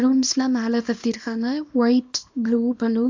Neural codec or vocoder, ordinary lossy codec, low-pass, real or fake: codec, 24 kHz, 0.9 kbps, WavTokenizer, small release; MP3, 64 kbps; 7.2 kHz; fake